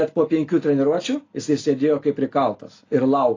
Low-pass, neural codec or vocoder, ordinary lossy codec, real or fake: 7.2 kHz; none; AAC, 32 kbps; real